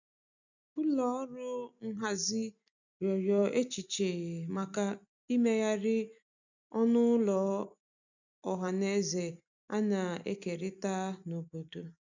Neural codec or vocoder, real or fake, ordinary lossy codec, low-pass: none; real; none; 7.2 kHz